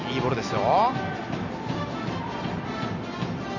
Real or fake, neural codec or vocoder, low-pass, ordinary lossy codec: real; none; 7.2 kHz; none